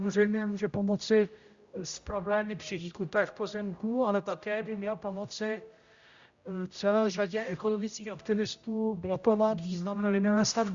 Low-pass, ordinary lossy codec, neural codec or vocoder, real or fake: 7.2 kHz; Opus, 64 kbps; codec, 16 kHz, 0.5 kbps, X-Codec, HuBERT features, trained on general audio; fake